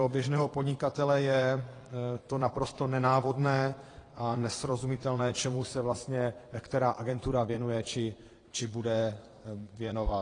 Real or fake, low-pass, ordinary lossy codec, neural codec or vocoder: fake; 9.9 kHz; AAC, 32 kbps; vocoder, 22.05 kHz, 80 mel bands, Vocos